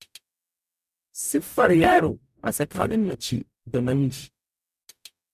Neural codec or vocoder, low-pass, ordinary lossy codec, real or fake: codec, 44.1 kHz, 0.9 kbps, DAC; 14.4 kHz; none; fake